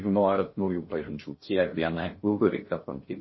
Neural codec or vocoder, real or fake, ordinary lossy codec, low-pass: codec, 16 kHz in and 24 kHz out, 0.6 kbps, FocalCodec, streaming, 2048 codes; fake; MP3, 24 kbps; 7.2 kHz